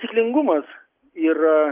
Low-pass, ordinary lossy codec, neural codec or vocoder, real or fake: 3.6 kHz; Opus, 24 kbps; none; real